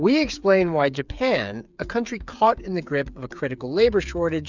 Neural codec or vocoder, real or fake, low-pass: codec, 16 kHz, 8 kbps, FreqCodec, smaller model; fake; 7.2 kHz